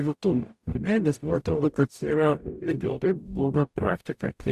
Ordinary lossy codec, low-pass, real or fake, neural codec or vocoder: Opus, 64 kbps; 14.4 kHz; fake; codec, 44.1 kHz, 0.9 kbps, DAC